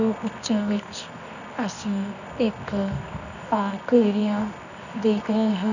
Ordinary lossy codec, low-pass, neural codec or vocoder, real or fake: none; 7.2 kHz; codec, 24 kHz, 0.9 kbps, WavTokenizer, medium music audio release; fake